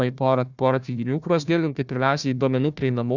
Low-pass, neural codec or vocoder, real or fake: 7.2 kHz; codec, 16 kHz, 1 kbps, FunCodec, trained on Chinese and English, 50 frames a second; fake